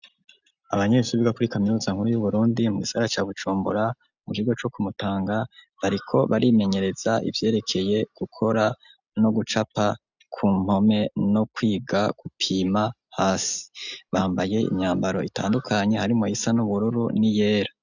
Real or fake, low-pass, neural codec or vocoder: real; 7.2 kHz; none